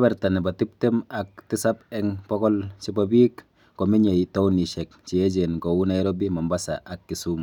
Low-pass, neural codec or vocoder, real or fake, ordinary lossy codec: 19.8 kHz; none; real; none